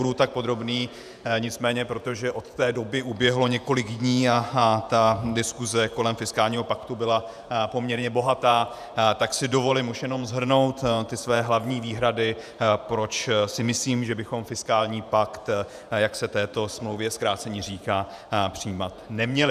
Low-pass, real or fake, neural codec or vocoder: 14.4 kHz; fake; vocoder, 48 kHz, 128 mel bands, Vocos